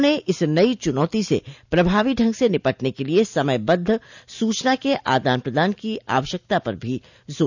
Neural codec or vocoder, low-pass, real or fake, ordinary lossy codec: none; 7.2 kHz; real; none